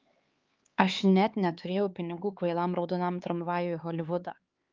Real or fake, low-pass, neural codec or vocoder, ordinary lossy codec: fake; 7.2 kHz; codec, 16 kHz, 4 kbps, X-Codec, HuBERT features, trained on LibriSpeech; Opus, 32 kbps